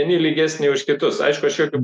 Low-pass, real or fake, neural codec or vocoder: 10.8 kHz; real; none